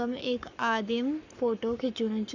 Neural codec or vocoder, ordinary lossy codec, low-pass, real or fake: codec, 44.1 kHz, 7.8 kbps, Pupu-Codec; none; 7.2 kHz; fake